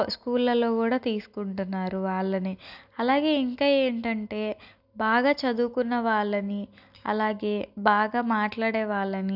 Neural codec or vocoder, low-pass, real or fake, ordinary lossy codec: none; 5.4 kHz; real; none